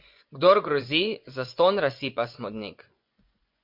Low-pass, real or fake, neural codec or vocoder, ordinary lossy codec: 5.4 kHz; fake; vocoder, 24 kHz, 100 mel bands, Vocos; MP3, 48 kbps